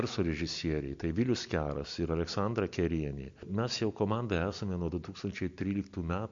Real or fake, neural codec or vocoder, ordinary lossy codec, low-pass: real; none; MP3, 48 kbps; 7.2 kHz